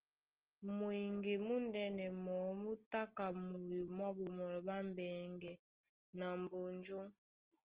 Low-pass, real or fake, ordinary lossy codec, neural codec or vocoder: 3.6 kHz; real; Opus, 16 kbps; none